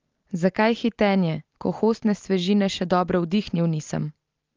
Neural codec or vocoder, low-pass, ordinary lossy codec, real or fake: none; 7.2 kHz; Opus, 32 kbps; real